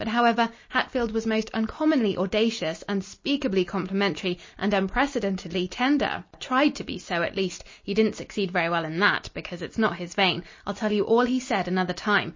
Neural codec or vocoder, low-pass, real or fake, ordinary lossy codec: none; 7.2 kHz; real; MP3, 32 kbps